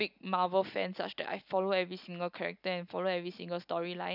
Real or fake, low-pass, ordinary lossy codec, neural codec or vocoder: real; 5.4 kHz; none; none